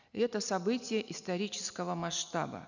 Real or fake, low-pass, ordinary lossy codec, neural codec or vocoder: real; 7.2 kHz; none; none